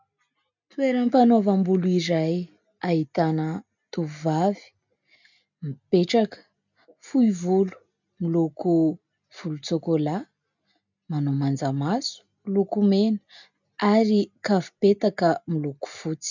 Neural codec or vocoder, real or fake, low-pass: none; real; 7.2 kHz